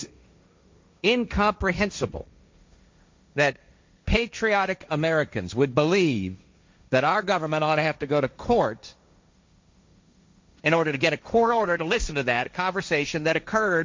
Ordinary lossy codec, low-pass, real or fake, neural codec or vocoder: MP3, 48 kbps; 7.2 kHz; fake; codec, 16 kHz, 1.1 kbps, Voila-Tokenizer